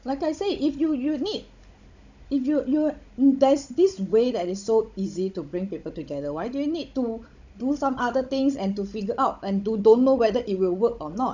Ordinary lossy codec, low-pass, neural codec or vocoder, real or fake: none; 7.2 kHz; codec, 16 kHz, 16 kbps, FunCodec, trained on Chinese and English, 50 frames a second; fake